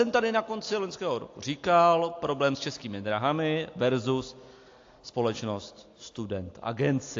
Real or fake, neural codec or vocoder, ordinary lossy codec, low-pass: real; none; AAC, 48 kbps; 7.2 kHz